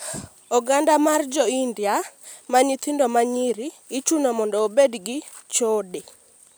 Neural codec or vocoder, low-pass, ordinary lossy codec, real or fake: none; none; none; real